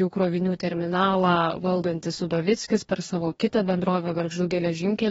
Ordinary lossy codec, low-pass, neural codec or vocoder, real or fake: AAC, 24 kbps; 19.8 kHz; codec, 44.1 kHz, 2.6 kbps, DAC; fake